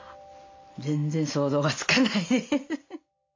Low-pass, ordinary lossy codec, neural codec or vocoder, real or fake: 7.2 kHz; MP3, 48 kbps; none; real